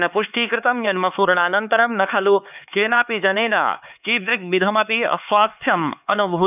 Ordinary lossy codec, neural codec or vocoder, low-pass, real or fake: none; codec, 16 kHz, 4 kbps, X-Codec, HuBERT features, trained on LibriSpeech; 3.6 kHz; fake